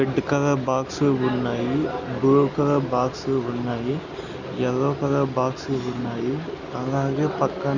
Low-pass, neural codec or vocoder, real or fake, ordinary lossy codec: 7.2 kHz; none; real; none